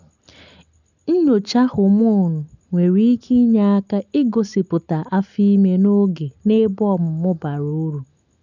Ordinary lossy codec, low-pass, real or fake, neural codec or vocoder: none; 7.2 kHz; real; none